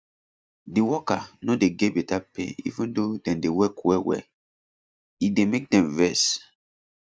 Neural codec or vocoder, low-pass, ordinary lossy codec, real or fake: none; none; none; real